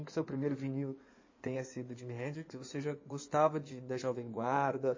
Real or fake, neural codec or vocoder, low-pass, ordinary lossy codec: fake; codec, 16 kHz in and 24 kHz out, 2.2 kbps, FireRedTTS-2 codec; 7.2 kHz; MP3, 32 kbps